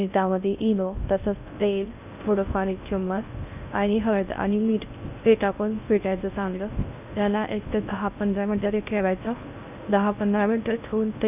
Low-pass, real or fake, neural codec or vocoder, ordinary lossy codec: 3.6 kHz; fake; codec, 16 kHz in and 24 kHz out, 0.6 kbps, FocalCodec, streaming, 4096 codes; none